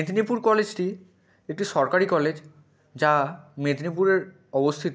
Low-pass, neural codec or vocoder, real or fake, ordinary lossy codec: none; none; real; none